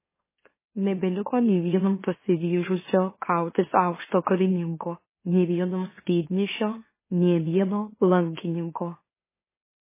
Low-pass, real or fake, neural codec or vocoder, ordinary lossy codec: 3.6 kHz; fake; autoencoder, 44.1 kHz, a latent of 192 numbers a frame, MeloTTS; MP3, 16 kbps